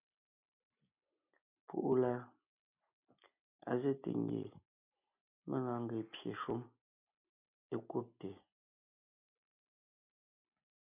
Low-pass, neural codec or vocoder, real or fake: 3.6 kHz; none; real